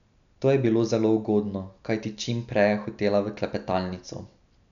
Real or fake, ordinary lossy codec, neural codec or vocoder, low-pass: real; none; none; 7.2 kHz